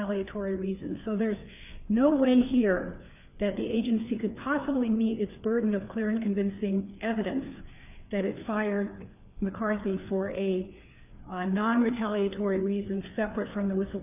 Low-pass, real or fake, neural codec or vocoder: 3.6 kHz; fake; codec, 16 kHz, 4 kbps, FreqCodec, larger model